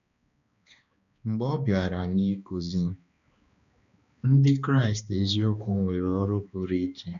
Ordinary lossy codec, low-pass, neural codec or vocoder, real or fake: none; 7.2 kHz; codec, 16 kHz, 2 kbps, X-Codec, HuBERT features, trained on balanced general audio; fake